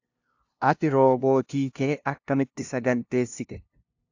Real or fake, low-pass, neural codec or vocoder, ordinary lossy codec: fake; 7.2 kHz; codec, 16 kHz, 0.5 kbps, FunCodec, trained on LibriTTS, 25 frames a second; AAC, 48 kbps